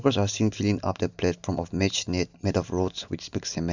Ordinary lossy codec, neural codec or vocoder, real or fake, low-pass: none; none; real; 7.2 kHz